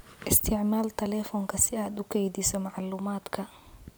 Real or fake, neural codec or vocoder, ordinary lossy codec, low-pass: real; none; none; none